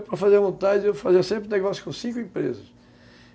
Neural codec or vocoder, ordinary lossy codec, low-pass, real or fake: none; none; none; real